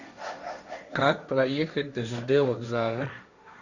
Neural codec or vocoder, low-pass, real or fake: codec, 16 kHz, 1.1 kbps, Voila-Tokenizer; 7.2 kHz; fake